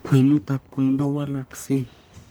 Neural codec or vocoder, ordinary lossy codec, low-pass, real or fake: codec, 44.1 kHz, 1.7 kbps, Pupu-Codec; none; none; fake